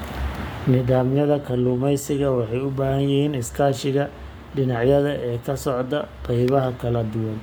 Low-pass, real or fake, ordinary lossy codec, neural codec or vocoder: none; fake; none; codec, 44.1 kHz, 7.8 kbps, Pupu-Codec